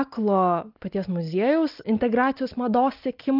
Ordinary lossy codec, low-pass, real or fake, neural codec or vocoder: Opus, 24 kbps; 5.4 kHz; real; none